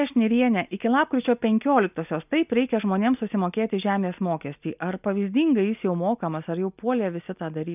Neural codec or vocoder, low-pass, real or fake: none; 3.6 kHz; real